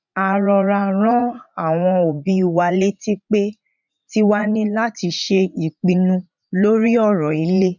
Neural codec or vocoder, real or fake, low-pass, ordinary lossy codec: vocoder, 44.1 kHz, 80 mel bands, Vocos; fake; 7.2 kHz; none